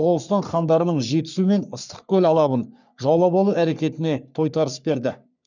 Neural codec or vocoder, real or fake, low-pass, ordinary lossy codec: codec, 44.1 kHz, 3.4 kbps, Pupu-Codec; fake; 7.2 kHz; none